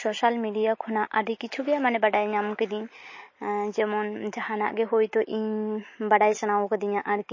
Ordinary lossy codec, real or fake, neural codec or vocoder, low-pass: MP3, 32 kbps; real; none; 7.2 kHz